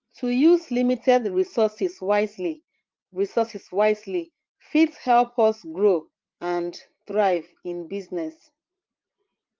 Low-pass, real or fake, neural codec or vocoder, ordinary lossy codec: 7.2 kHz; fake; vocoder, 44.1 kHz, 80 mel bands, Vocos; Opus, 32 kbps